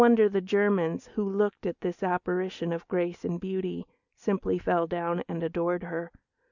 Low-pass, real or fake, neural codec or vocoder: 7.2 kHz; real; none